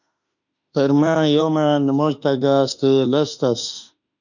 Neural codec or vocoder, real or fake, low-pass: autoencoder, 48 kHz, 32 numbers a frame, DAC-VAE, trained on Japanese speech; fake; 7.2 kHz